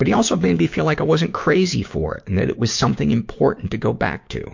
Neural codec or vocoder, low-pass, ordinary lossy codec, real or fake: codec, 16 kHz, 6 kbps, DAC; 7.2 kHz; MP3, 48 kbps; fake